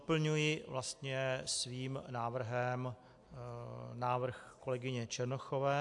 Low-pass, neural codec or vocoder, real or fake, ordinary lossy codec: 10.8 kHz; none; real; AAC, 64 kbps